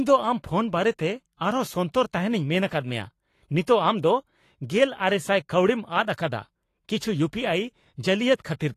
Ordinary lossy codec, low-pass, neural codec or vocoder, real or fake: AAC, 48 kbps; 14.4 kHz; codec, 44.1 kHz, 7.8 kbps, Pupu-Codec; fake